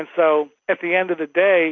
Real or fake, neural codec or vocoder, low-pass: real; none; 7.2 kHz